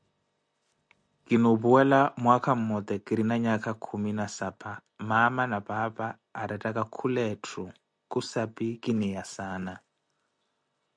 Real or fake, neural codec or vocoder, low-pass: real; none; 9.9 kHz